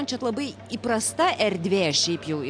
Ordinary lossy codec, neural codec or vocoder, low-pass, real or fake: AAC, 64 kbps; none; 9.9 kHz; real